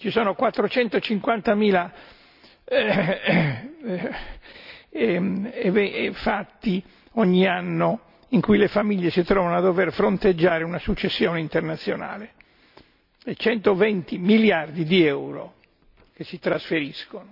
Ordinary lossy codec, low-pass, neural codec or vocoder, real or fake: none; 5.4 kHz; none; real